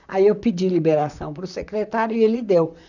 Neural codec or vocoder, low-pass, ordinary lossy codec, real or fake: vocoder, 44.1 kHz, 128 mel bands, Pupu-Vocoder; 7.2 kHz; none; fake